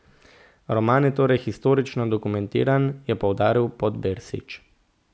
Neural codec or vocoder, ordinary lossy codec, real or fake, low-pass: none; none; real; none